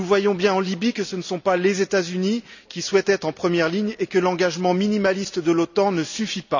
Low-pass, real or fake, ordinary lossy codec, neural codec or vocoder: 7.2 kHz; real; none; none